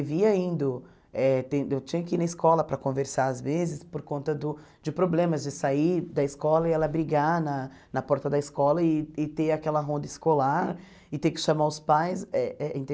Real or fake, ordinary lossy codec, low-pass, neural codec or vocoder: real; none; none; none